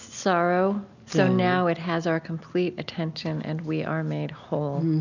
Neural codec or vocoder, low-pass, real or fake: none; 7.2 kHz; real